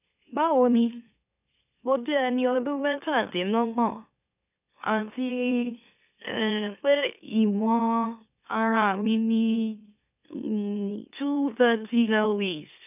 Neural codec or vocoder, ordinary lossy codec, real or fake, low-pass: autoencoder, 44.1 kHz, a latent of 192 numbers a frame, MeloTTS; none; fake; 3.6 kHz